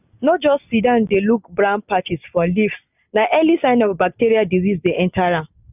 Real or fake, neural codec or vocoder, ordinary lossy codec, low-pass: real; none; none; 3.6 kHz